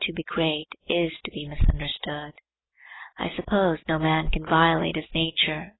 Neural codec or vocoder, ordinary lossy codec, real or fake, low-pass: none; AAC, 16 kbps; real; 7.2 kHz